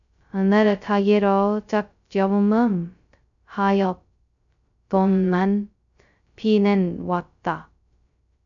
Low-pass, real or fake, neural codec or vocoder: 7.2 kHz; fake; codec, 16 kHz, 0.2 kbps, FocalCodec